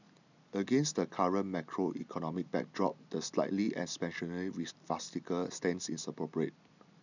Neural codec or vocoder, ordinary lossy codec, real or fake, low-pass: none; none; real; 7.2 kHz